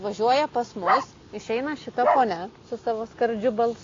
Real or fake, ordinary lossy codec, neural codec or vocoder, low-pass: real; AAC, 32 kbps; none; 7.2 kHz